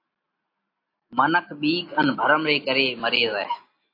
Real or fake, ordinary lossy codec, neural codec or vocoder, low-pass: real; AAC, 32 kbps; none; 5.4 kHz